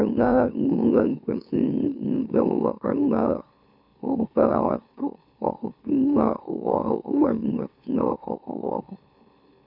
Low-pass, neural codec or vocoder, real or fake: 5.4 kHz; autoencoder, 44.1 kHz, a latent of 192 numbers a frame, MeloTTS; fake